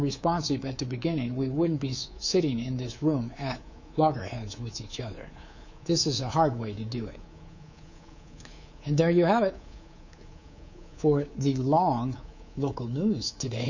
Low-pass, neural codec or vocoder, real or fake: 7.2 kHz; codec, 24 kHz, 3.1 kbps, DualCodec; fake